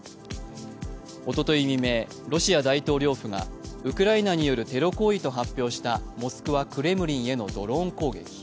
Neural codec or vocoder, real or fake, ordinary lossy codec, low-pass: none; real; none; none